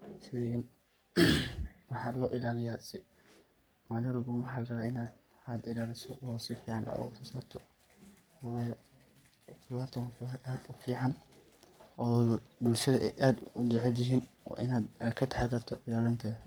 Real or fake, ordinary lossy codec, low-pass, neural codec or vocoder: fake; none; none; codec, 44.1 kHz, 3.4 kbps, Pupu-Codec